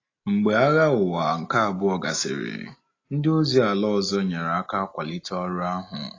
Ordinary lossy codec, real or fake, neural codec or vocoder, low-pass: AAC, 32 kbps; real; none; 7.2 kHz